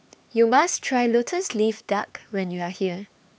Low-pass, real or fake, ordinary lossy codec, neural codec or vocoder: none; fake; none; codec, 16 kHz, 4 kbps, X-Codec, HuBERT features, trained on LibriSpeech